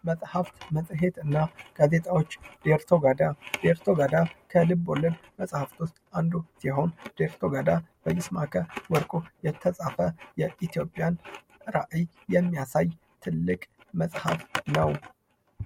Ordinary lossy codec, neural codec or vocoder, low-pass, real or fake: MP3, 64 kbps; none; 19.8 kHz; real